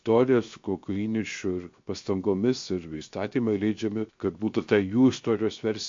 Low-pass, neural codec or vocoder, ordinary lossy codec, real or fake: 7.2 kHz; codec, 16 kHz, 0.7 kbps, FocalCodec; MP3, 48 kbps; fake